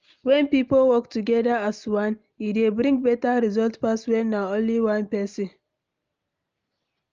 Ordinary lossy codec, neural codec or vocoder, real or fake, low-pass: Opus, 24 kbps; none; real; 7.2 kHz